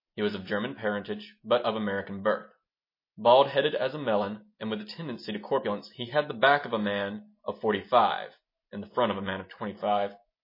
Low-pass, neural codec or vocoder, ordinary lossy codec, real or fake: 5.4 kHz; none; MP3, 24 kbps; real